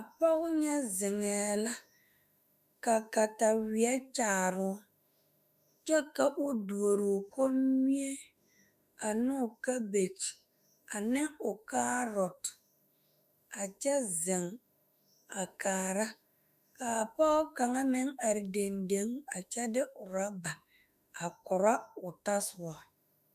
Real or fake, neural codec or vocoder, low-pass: fake; autoencoder, 48 kHz, 32 numbers a frame, DAC-VAE, trained on Japanese speech; 14.4 kHz